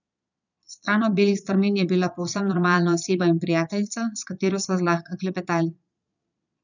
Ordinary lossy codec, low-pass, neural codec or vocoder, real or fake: none; 7.2 kHz; vocoder, 22.05 kHz, 80 mel bands, Vocos; fake